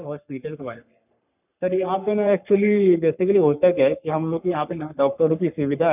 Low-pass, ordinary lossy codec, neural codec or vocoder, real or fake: 3.6 kHz; none; codec, 44.1 kHz, 3.4 kbps, Pupu-Codec; fake